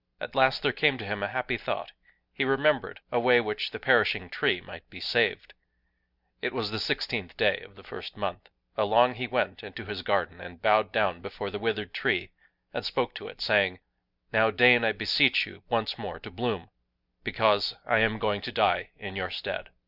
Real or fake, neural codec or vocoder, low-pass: real; none; 5.4 kHz